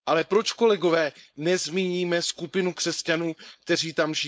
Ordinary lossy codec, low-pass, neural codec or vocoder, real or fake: none; none; codec, 16 kHz, 4.8 kbps, FACodec; fake